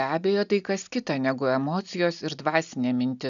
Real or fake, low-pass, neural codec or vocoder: real; 7.2 kHz; none